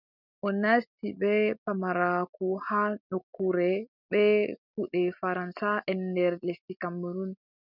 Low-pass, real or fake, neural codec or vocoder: 5.4 kHz; real; none